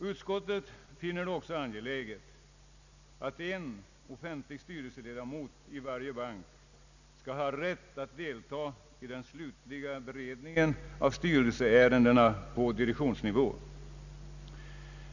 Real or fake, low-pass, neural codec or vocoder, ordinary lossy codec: real; 7.2 kHz; none; none